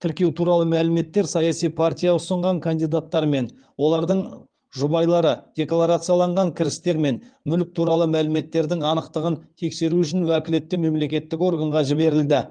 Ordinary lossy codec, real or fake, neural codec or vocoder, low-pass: Opus, 32 kbps; fake; codec, 16 kHz in and 24 kHz out, 2.2 kbps, FireRedTTS-2 codec; 9.9 kHz